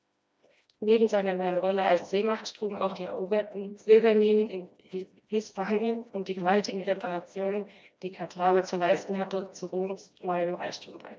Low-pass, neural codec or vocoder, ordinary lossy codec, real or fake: none; codec, 16 kHz, 1 kbps, FreqCodec, smaller model; none; fake